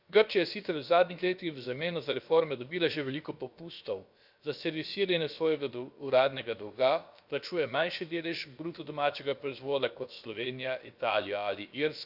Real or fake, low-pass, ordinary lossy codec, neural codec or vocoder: fake; 5.4 kHz; none; codec, 16 kHz, about 1 kbps, DyCAST, with the encoder's durations